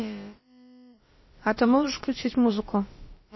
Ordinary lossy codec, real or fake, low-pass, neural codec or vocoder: MP3, 24 kbps; fake; 7.2 kHz; codec, 16 kHz, about 1 kbps, DyCAST, with the encoder's durations